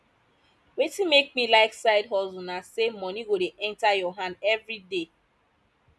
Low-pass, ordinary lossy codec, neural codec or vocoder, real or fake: none; none; none; real